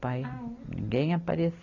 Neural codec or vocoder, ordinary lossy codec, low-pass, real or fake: none; none; 7.2 kHz; real